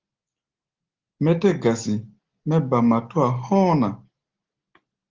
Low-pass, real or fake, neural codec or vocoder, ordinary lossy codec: 7.2 kHz; real; none; Opus, 16 kbps